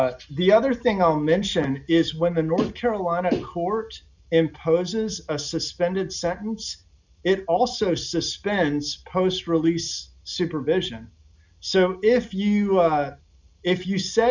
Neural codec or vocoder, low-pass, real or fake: none; 7.2 kHz; real